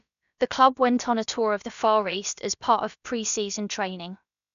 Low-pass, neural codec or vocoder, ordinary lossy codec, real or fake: 7.2 kHz; codec, 16 kHz, about 1 kbps, DyCAST, with the encoder's durations; none; fake